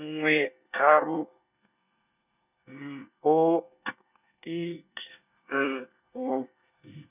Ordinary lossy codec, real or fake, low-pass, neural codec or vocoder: none; fake; 3.6 kHz; codec, 24 kHz, 1 kbps, SNAC